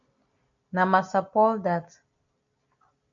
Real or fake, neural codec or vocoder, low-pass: real; none; 7.2 kHz